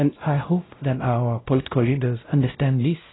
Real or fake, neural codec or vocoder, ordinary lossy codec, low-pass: fake; codec, 16 kHz, 0.5 kbps, X-Codec, WavLM features, trained on Multilingual LibriSpeech; AAC, 16 kbps; 7.2 kHz